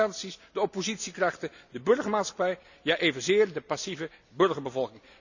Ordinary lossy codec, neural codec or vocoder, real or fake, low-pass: none; none; real; 7.2 kHz